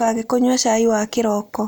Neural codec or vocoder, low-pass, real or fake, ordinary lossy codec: none; none; real; none